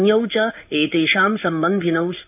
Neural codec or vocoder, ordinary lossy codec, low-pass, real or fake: codec, 16 kHz in and 24 kHz out, 1 kbps, XY-Tokenizer; none; 3.6 kHz; fake